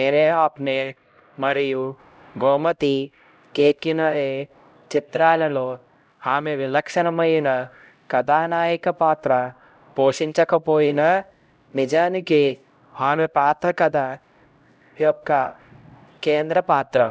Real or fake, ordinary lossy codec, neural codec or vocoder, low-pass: fake; none; codec, 16 kHz, 0.5 kbps, X-Codec, HuBERT features, trained on LibriSpeech; none